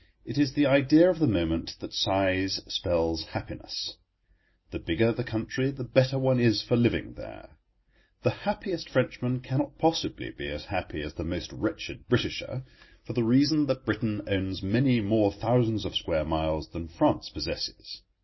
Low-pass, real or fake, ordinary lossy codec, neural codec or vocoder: 7.2 kHz; real; MP3, 24 kbps; none